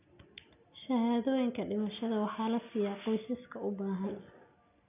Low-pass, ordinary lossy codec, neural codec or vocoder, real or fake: 3.6 kHz; none; none; real